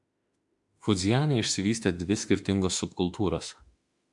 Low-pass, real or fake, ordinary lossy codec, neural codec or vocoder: 10.8 kHz; fake; MP3, 96 kbps; autoencoder, 48 kHz, 32 numbers a frame, DAC-VAE, trained on Japanese speech